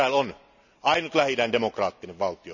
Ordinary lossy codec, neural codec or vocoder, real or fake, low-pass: none; none; real; 7.2 kHz